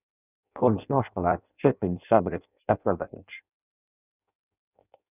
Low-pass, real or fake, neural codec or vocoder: 3.6 kHz; fake; codec, 16 kHz in and 24 kHz out, 0.6 kbps, FireRedTTS-2 codec